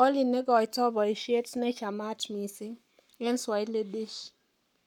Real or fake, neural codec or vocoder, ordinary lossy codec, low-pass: fake; codec, 44.1 kHz, 7.8 kbps, Pupu-Codec; none; 19.8 kHz